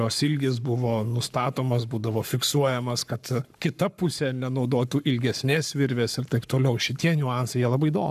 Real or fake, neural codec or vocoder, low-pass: fake; codec, 44.1 kHz, 7.8 kbps, Pupu-Codec; 14.4 kHz